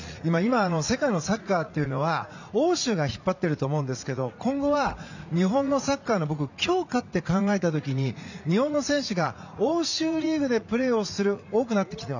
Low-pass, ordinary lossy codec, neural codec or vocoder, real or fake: 7.2 kHz; none; vocoder, 44.1 kHz, 80 mel bands, Vocos; fake